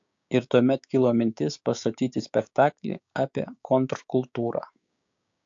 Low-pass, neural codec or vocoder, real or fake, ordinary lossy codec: 7.2 kHz; codec, 16 kHz, 6 kbps, DAC; fake; AAC, 64 kbps